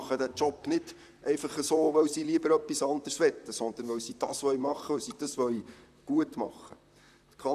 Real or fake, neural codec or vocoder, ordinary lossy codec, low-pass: fake; vocoder, 44.1 kHz, 128 mel bands, Pupu-Vocoder; none; 14.4 kHz